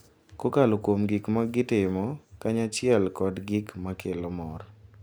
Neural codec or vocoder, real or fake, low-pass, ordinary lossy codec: none; real; none; none